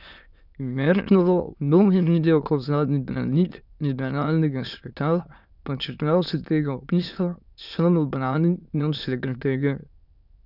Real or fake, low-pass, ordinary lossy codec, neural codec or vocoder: fake; 5.4 kHz; none; autoencoder, 22.05 kHz, a latent of 192 numbers a frame, VITS, trained on many speakers